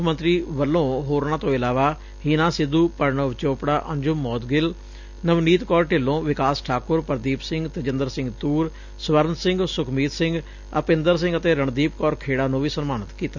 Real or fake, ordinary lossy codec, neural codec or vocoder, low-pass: real; none; none; 7.2 kHz